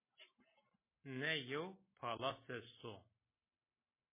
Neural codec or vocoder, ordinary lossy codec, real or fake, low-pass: none; MP3, 16 kbps; real; 3.6 kHz